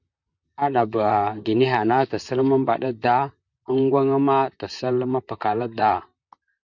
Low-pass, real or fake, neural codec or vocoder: 7.2 kHz; fake; vocoder, 44.1 kHz, 128 mel bands, Pupu-Vocoder